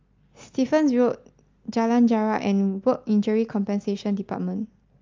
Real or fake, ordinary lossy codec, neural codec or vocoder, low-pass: real; Opus, 32 kbps; none; 7.2 kHz